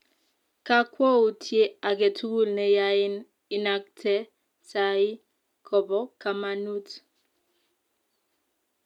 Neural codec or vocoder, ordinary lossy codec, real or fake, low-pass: none; none; real; 19.8 kHz